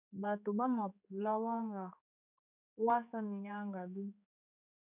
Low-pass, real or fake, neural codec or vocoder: 3.6 kHz; fake; codec, 16 kHz, 4 kbps, X-Codec, HuBERT features, trained on general audio